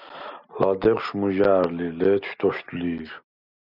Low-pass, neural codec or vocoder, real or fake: 5.4 kHz; none; real